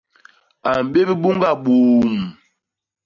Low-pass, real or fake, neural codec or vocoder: 7.2 kHz; real; none